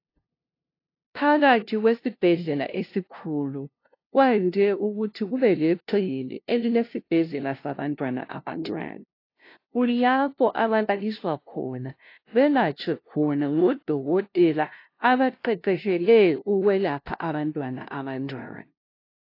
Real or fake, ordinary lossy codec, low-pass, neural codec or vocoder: fake; AAC, 32 kbps; 5.4 kHz; codec, 16 kHz, 0.5 kbps, FunCodec, trained on LibriTTS, 25 frames a second